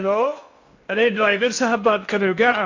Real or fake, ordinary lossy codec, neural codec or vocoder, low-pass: fake; AAC, 48 kbps; codec, 16 kHz in and 24 kHz out, 0.6 kbps, FocalCodec, streaming, 2048 codes; 7.2 kHz